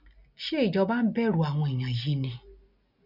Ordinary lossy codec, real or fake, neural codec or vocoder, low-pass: none; real; none; 5.4 kHz